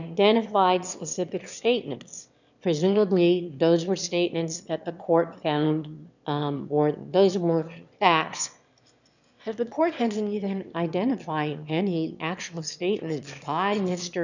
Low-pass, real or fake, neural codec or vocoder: 7.2 kHz; fake; autoencoder, 22.05 kHz, a latent of 192 numbers a frame, VITS, trained on one speaker